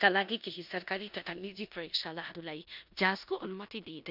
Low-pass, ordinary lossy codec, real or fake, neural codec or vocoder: 5.4 kHz; none; fake; codec, 16 kHz in and 24 kHz out, 0.9 kbps, LongCat-Audio-Codec, four codebook decoder